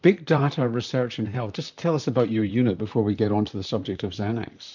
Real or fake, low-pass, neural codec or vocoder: fake; 7.2 kHz; vocoder, 44.1 kHz, 128 mel bands, Pupu-Vocoder